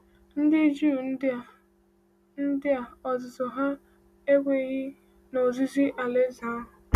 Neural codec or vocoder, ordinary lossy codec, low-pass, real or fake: none; none; 14.4 kHz; real